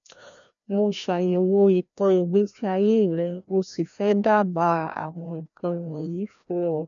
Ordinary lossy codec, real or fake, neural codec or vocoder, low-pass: none; fake; codec, 16 kHz, 1 kbps, FreqCodec, larger model; 7.2 kHz